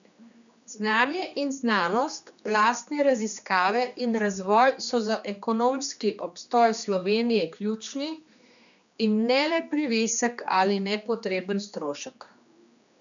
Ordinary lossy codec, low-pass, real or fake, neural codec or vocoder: none; 7.2 kHz; fake; codec, 16 kHz, 2 kbps, X-Codec, HuBERT features, trained on general audio